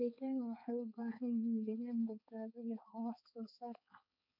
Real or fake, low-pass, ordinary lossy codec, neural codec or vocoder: fake; 5.4 kHz; none; codec, 16 kHz, 4 kbps, X-Codec, HuBERT features, trained on balanced general audio